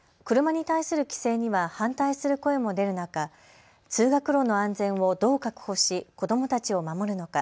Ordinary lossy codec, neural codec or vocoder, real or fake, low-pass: none; none; real; none